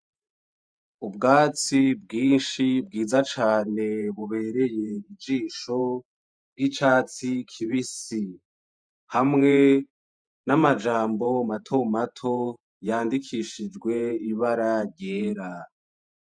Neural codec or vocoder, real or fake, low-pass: vocoder, 48 kHz, 128 mel bands, Vocos; fake; 9.9 kHz